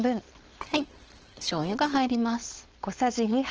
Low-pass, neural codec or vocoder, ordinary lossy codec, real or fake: 7.2 kHz; codec, 16 kHz, 4.8 kbps, FACodec; Opus, 16 kbps; fake